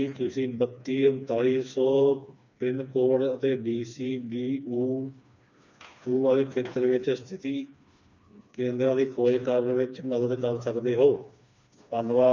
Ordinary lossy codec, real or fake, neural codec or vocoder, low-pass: none; fake; codec, 16 kHz, 2 kbps, FreqCodec, smaller model; 7.2 kHz